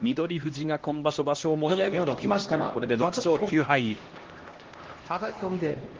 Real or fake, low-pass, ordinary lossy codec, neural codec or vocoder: fake; 7.2 kHz; Opus, 16 kbps; codec, 16 kHz, 1 kbps, X-Codec, HuBERT features, trained on LibriSpeech